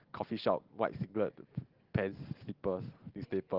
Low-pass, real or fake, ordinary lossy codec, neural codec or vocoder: 5.4 kHz; real; Opus, 16 kbps; none